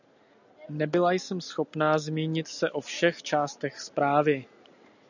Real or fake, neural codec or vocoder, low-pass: real; none; 7.2 kHz